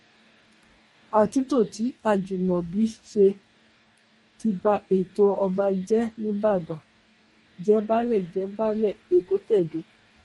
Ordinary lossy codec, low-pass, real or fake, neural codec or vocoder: MP3, 48 kbps; 19.8 kHz; fake; codec, 44.1 kHz, 2.6 kbps, DAC